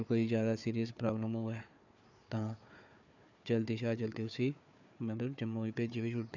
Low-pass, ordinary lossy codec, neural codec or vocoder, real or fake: 7.2 kHz; none; codec, 16 kHz, 4 kbps, FunCodec, trained on Chinese and English, 50 frames a second; fake